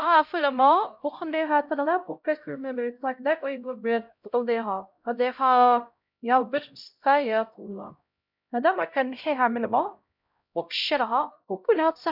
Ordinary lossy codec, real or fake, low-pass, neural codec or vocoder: none; fake; 5.4 kHz; codec, 16 kHz, 0.5 kbps, X-Codec, HuBERT features, trained on LibriSpeech